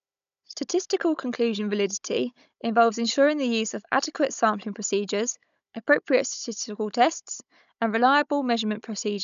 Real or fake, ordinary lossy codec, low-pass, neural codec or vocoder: fake; none; 7.2 kHz; codec, 16 kHz, 16 kbps, FunCodec, trained on Chinese and English, 50 frames a second